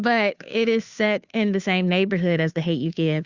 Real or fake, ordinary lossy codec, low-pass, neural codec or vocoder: fake; Opus, 64 kbps; 7.2 kHz; codec, 16 kHz, 2 kbps, FunCodec, trained on Chinese and English, 25 frames a second